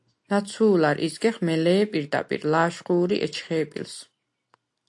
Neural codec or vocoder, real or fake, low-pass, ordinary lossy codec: none; real; 10.8 kHz; AAC, 64 kbps